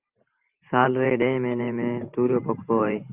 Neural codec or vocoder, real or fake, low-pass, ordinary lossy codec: vocoder, 44.1 kHz, 128 mel bands every 512 samples, BigVGAN v2; fake; 3.6 kHz; Opus, 24 kbps